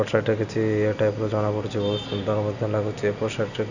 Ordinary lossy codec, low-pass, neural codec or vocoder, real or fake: none; 7.2 kHz; none; real